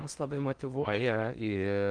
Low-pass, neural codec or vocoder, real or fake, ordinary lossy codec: 9.9 kHz; codec, 16 kHz in and 24 kHz out, 0.8 kbps, FocalCodec, streaming, 65536 codes; fake; Opus, 24 kbps